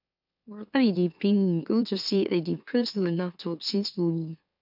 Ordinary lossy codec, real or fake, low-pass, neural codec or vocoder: none; fake; 5.4 kHz; autoencoder, 44.1 kHz, a latent of 192 numbers a frame, MeloTTS